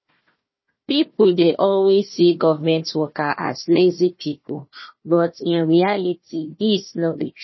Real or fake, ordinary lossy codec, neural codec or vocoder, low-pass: fake; MP3, 24 kbps; codec, 16 kHz, 1 kbps, FunCodec, trained on Chinese and English, 50 frames a second; 7.2 kHz